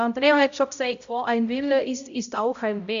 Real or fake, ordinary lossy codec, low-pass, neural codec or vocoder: fake; none; 7.2 kHz; codec, 16 kHz, 0.5 kbps, X-Codec, HuBERT features, trained on balanced general audio